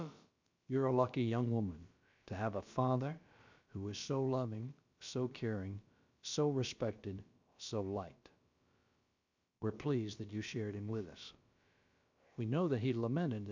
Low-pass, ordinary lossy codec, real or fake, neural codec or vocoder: 7.2 kHz; MP3, 64 kbps; fake; codec, 16 kHz, about 1 kbps, DyCAST, with the encoder's durations